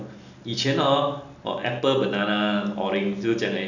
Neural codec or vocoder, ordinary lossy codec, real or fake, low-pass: none; none; real; 7.2 kHz